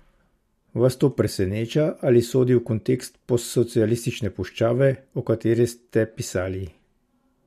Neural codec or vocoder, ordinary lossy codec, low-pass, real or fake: none; MP3, 64 kbps; 14.4 kHz; real